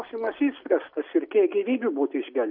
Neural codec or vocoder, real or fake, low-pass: none; real; 5.4 kHz